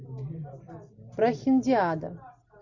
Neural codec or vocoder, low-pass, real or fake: none; 7.2 kHz; real